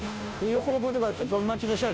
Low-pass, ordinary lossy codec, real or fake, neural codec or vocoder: none; none; fake; codec, 16 kHz, 0.5 kbps, FunCodec, trained on Chinese and English, 25 frames a second